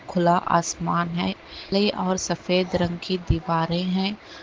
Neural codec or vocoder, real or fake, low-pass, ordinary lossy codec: none; real; 7.2 kHz; Opus, 16 kbps